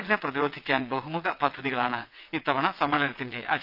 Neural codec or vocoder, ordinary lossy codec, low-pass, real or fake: vocoder, 22.05 kHz, 80 mel bands, WaveNeXt; none; 5.4 kHz; fake